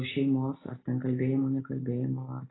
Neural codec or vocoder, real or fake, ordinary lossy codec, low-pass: none; real; AAC, 16 kbps; 7.2 kHz